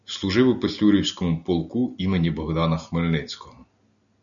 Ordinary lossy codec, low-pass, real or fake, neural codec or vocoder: MP3, 96 kbps; 7.2 kHz; real; none